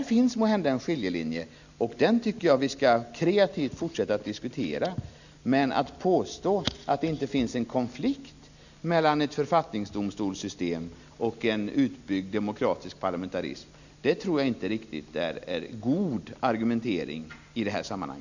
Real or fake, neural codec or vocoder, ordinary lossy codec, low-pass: real; none; none; 7.2 kHz